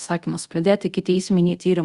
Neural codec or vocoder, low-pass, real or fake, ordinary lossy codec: codec, 24 kHz, 0.5 kbps, DualCodec; 10.8 kHz; fake; Opus, 64 kbps